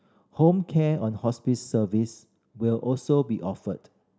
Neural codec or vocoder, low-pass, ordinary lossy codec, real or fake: none; none; none; real